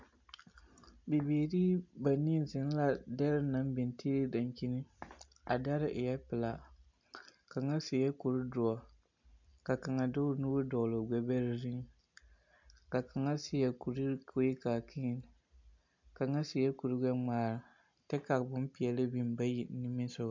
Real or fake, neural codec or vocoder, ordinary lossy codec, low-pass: real; none; MP3, 64 kbps; 7.2 kHz